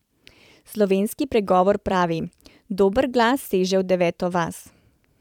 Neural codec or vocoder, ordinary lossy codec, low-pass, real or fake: vocoder, 44.1 kHz, 128 mel bands every 512 samples, BigVGAN v2; none; 19.8 kHz; fake